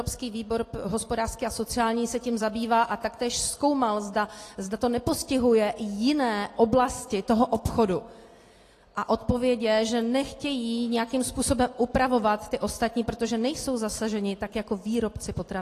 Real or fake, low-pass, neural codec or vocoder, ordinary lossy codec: real; 14.4 kHz; none; AAC, 48 kbps